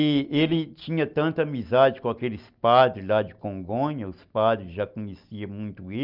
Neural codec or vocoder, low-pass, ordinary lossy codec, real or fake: none; 5.4 kHz; Opus, 24 kbps; real